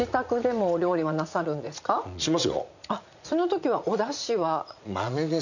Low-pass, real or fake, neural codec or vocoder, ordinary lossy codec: 7.2 kHz; fake; vocoder, 44.1 kHz, 80 mel bands, Vocos; none